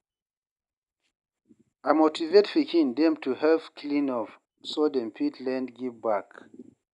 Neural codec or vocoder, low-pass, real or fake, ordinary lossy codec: none; 10.8 kHz; real; none